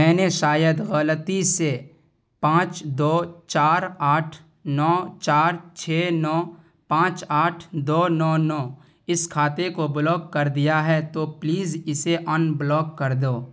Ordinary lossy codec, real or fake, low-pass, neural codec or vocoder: none; real; none; none